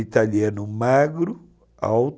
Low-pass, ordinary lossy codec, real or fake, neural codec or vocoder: none; none; real; none